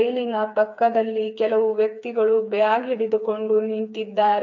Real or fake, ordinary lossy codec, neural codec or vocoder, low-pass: fake; MP3, 64 kbps; codec, 16 kHz, 4 kbps, FreqCodec, smaller model; 7.2 kHz